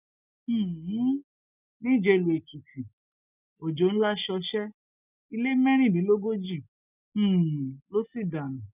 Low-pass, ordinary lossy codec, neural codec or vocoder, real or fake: 3.6 kHz; none; none; real